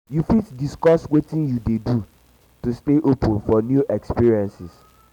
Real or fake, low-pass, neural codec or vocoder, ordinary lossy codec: real; 19.8 kHz; none; none